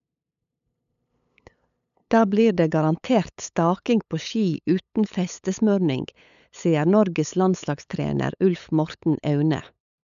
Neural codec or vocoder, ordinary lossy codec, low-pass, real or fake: codec, 16 kHz, 8 kbps, FunCodec, trained on LibriTTS, 25 frames a second; none; 7.2 kHz; fake